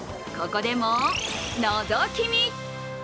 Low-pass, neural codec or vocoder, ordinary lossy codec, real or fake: none; none; none; real